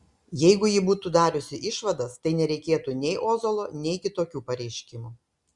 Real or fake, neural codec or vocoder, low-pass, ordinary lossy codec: real; none; 10.8 kHz; Opus, 64 kbps